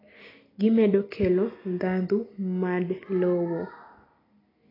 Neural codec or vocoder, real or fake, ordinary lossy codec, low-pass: none; real; AAC, 24 kbps; 5.4 kHz